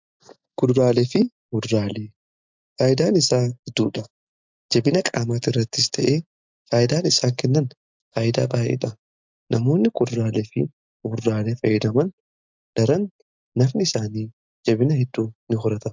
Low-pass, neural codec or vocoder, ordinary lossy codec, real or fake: 7.2 kHz; none; MP3, 64 kbps; real